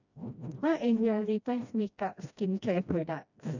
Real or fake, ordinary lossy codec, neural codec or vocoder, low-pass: fake; none; codec, 16 kHz, 1 kbps, FreqCodec, smaller model; 7.2 kHz